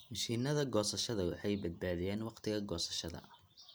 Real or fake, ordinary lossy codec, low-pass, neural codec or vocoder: real; none; none; none